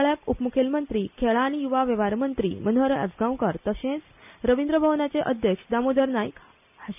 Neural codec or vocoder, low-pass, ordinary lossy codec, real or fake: none; 3.6 kHz; none; real